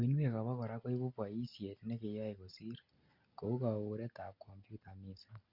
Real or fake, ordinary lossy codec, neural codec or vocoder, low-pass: real; Opus, 64 kbps; none; 5.4 kHz